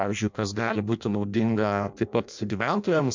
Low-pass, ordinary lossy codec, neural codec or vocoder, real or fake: 7.2 kHz; MP3, 64 kbps; codec, 16 kHz in and 24 kHz out, 0.6 kbps, FireRedTTS-2 codec; fake